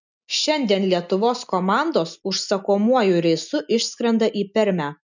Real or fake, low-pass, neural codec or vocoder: real; 7.2 kHz; none